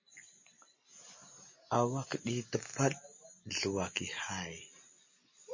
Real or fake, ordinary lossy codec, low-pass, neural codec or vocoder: real; MP3, 32 kbps; 7.2 kHz; none